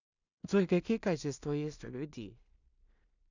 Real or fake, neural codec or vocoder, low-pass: fake; codec, 16 kHz in and 24 kHz out, 0.4 kbps, LongCat-Audio-Codec, two codebook decoder; 7.2 kHz